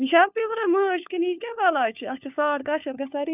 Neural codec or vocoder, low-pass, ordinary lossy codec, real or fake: codec, 16 kHz, 4 kbps, FunCodec, trained on LibriTTS, 50 frames a second; 3.6 kHz; none; fake